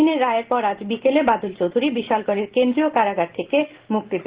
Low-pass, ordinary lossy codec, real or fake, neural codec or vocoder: 3.6 kHz; Opus, 16 kbps; real; none